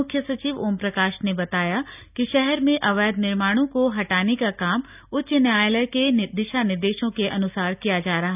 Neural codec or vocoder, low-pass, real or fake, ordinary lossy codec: none; 3.6 kHz; real; none